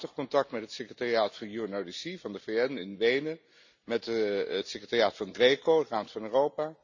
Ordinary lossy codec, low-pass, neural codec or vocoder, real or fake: none; 7.2 kHz; none; real